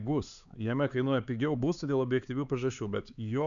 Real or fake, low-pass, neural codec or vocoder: fake; 7.2 kHz; codec, 16 kHz, 4 kbps, X-Codec, HuBERT features, trained on LibriSpeech